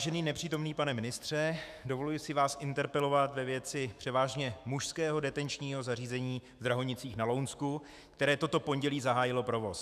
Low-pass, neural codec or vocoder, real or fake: 14.4 kHz; autoencoder, 48 kHz, 128 numbers a frame, DAC-VAE, trained on Japanese speech; fake